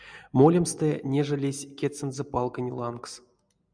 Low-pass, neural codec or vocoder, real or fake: 9.9 kHz; none; real